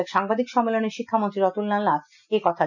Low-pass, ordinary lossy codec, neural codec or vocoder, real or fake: 7.2 kHz; none; none; real